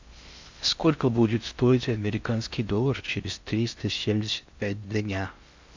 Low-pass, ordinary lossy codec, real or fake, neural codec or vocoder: 7.2 kHz; MP3, 64 kbps; fake; codec, 16 kHz in and 24 kHz out, 0.6 kbps, FocalCodec, streaming, 4096 codes